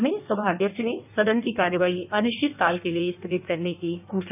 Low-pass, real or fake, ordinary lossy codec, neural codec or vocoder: 3.6 kHz; fake; none; codec, 16 kHz in and 24 kHz out, 1.1 kbps, FireRedTTS-2 codec